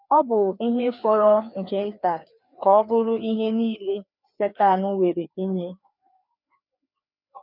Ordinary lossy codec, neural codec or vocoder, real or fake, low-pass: none; codec, 16 kHz, 2 kbps, FreqCodec, larger model; fake; 5.4 kHz